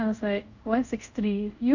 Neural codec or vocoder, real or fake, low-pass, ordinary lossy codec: codec, 24 kHz, 0.5 kbps, DualCodec; fake; 7.2 kHz; none